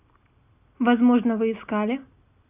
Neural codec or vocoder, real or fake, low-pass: none; real; 3.6 kHz